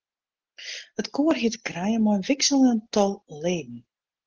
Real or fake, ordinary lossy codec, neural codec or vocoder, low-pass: real; Opus, 16 kbps; none; 7.2 kHz